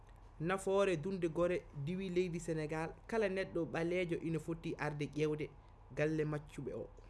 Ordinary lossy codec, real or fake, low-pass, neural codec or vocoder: none; real; none; none